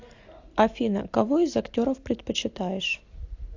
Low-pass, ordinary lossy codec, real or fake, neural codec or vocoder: 7.2 kHz; AAC, 48 kbps; real; none